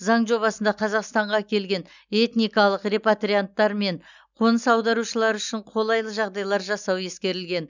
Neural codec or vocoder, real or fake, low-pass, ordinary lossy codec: none; real; 7.2 kHz; none